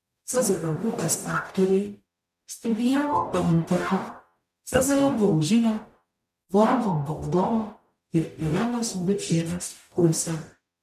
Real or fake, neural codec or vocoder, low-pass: fake; codec, 44.1 kHz, 0.9 kbps, DAC; 14.4 kHz